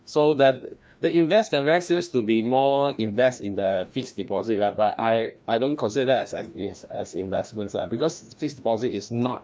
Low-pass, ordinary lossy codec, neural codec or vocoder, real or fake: none; none; codec, 16 kHz, 1 kbps, FreqCodec, larger model; fake